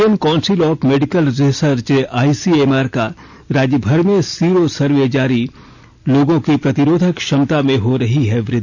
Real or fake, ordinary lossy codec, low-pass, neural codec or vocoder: real; none; 7.2 kHz; none